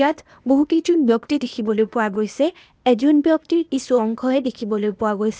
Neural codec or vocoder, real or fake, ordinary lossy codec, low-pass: codec, 16 kHz, 0.8 kbps, ZipCodec; fake; none; none